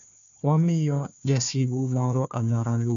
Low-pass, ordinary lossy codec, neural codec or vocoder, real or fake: 7.2 kHz; none; codec, 16 kHz, 1 kbps, FunCodec, trained on Chinese and English, 50 frames a second; fake